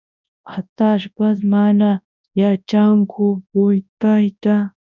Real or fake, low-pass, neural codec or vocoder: fake; 7.2 kHz; codec, 24 kHz, 0.9 kbps, WavTokenizer, large speech release